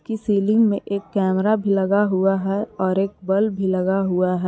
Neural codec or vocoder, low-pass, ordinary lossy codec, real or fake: none; none; none; real